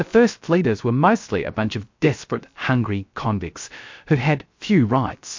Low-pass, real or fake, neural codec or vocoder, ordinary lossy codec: 7.2 kHz; fake; codec, 16 kHz, 0.3 kbps, FocalCodec; MP3, 48 kbps